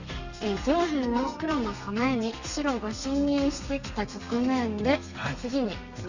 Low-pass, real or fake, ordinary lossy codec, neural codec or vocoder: 7.2 kHz; fake; none; codec, 32 kHz, 1.9 kbps, SNAC